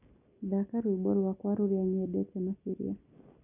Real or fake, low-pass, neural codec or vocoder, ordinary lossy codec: real; 3.6 kHz; none; none